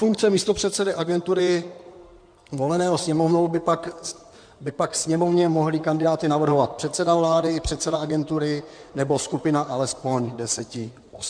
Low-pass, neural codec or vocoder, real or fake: 9.9 kHz; codec, 16 kHz in and 24 kHz out, 2.2 kbps, FireRedTTS-2 codec; fake